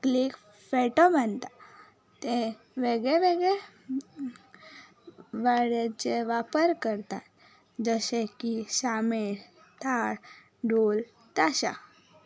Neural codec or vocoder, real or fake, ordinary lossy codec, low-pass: none; real; none; none